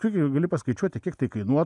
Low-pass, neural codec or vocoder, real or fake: 10.8 kHz; none; real